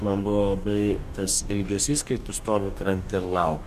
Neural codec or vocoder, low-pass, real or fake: codec, 44.1 kHz, 2.6 kbps, DAC; 14.4 kHz; fake